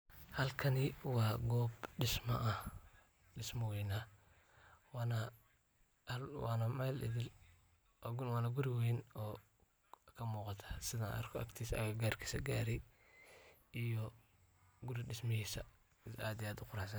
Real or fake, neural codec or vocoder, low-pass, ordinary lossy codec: real; none; none; none